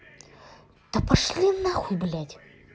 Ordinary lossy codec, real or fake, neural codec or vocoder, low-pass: none; real; none; none